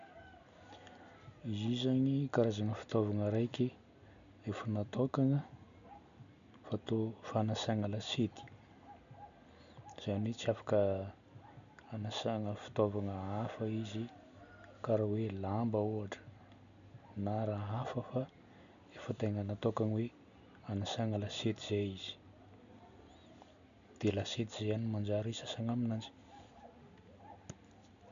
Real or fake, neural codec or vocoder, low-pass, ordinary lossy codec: real; none; 7.2 kHz; none